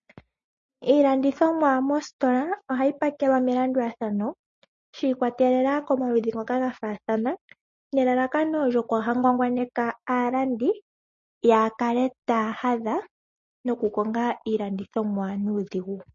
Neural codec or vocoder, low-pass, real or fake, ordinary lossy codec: none; 7.2 kHz; real; MP3, 32 kbps